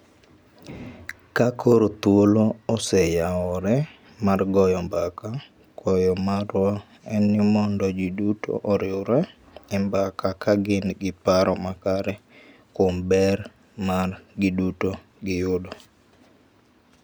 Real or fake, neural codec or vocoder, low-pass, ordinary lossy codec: real; none; none; none